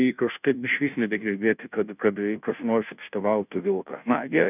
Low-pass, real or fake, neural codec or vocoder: 3.6 kHz; fake; codec, 16 kHz, 0.5 kbps, FunCodec, trained on Chinese and English, 25 frames a second